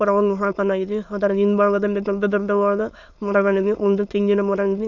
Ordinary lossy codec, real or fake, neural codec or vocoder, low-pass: none; fake; autoencoder, 22.05 kHz, a latent of 192 numbers a frame, VITS, trained on many speakers; 7.2 kHz